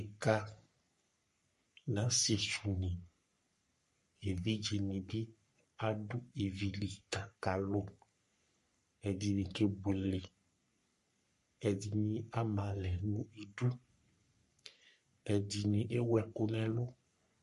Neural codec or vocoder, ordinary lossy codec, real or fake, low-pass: codec, 44.1 kHz, 3.4 kbps, Pupu-Codec; MP3, 48 kbps; fake; 14.4 kHz